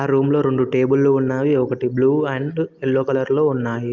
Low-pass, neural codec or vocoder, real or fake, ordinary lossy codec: 7.2 kHz; none; real; Opus, 24 kbps